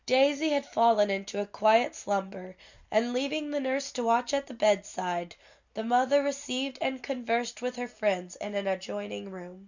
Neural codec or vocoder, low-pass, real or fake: none; 7.2 kHz; real